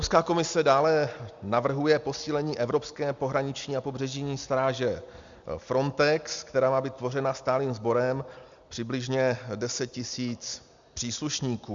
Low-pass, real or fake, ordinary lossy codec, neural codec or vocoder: 7.2 kHz; real; Opus, 64 kbps; none